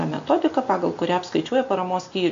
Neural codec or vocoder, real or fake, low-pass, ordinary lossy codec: none; real; 7.2 kHz; AAC, 64 kbps